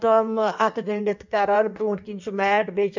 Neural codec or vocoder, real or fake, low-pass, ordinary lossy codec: codec, 16 kHz in and 24 kHz out, 1.1 kbps, FireRedTTS-2 codec; fake; 7.2 kHz; none